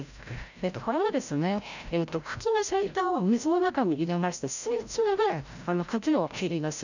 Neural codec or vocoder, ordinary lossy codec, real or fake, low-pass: codec, 16 kHz, 0.5 kbps, FreqCodec, larger model; none; fake; 7.2 kHz